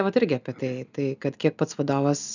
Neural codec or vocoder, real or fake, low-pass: none; real; 7.2 kHz